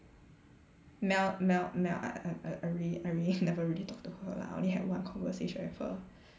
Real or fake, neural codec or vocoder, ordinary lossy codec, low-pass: real; none; none; none